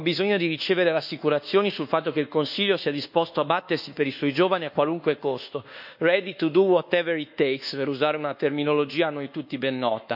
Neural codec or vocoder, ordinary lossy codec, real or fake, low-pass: codec, 24 kHz, 1.2 kbps, DualCodec; none; fake; 5.4 kHz